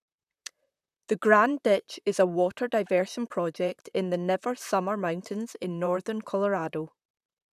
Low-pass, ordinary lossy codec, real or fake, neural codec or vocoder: 14.4 kHz; none; fake; vocoder, 44.1 kHz, 128 mel bands, Pupu-Vocoder